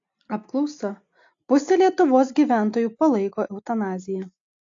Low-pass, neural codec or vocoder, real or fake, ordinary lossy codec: 7.2 kHz; none; real; AAC, 48 kbps